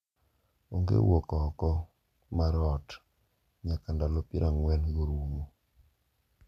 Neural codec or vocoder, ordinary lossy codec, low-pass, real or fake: none; none; 14.4 kHz; real